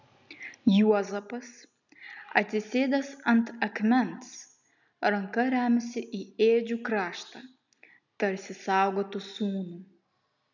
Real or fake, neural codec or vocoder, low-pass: real; none; 7.2 kHz